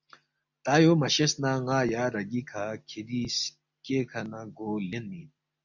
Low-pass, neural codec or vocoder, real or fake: 7.2 kHz; none; real